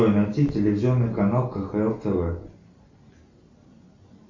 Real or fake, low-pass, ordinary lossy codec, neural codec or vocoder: real; 7.2 kHz; MP3, 48 kbps; none